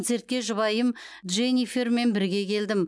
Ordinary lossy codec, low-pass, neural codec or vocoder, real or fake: none; none; none; real